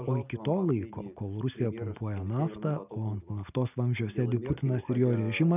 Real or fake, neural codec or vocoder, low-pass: real; none; 3.6 kHz